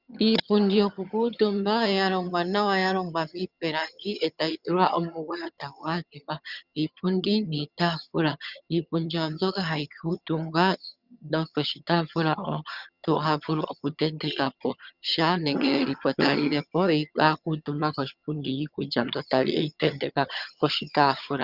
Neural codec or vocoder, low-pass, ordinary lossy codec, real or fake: vocoder, 22.05 kHz, 80 mel bands, HiFi-GAN; 5.4 kHz; Opus, 64 kbps; fake